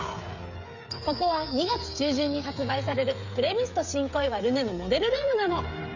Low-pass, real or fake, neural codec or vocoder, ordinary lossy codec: 7.2 kHz; fake; codec, 16 kHz, 16 kbps, FreqCodec, smaller model; none